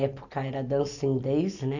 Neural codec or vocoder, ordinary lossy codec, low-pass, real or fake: none; none; 7.2 kHz; real